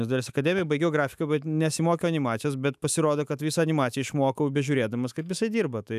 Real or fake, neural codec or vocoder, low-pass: fake; autoencoder, 48 kHz, 128 numbers a frame, DAC-VAE, trained on Japanese speech; 14.4 kHz